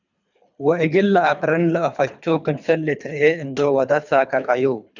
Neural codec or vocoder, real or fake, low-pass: codec, 24 kHz, 3 kbps, HILCodec; fake; 7.2 kHz